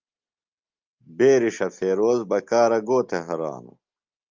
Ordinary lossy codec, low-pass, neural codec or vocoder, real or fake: Opus, 24 kbps; 7.2 kHz; none; real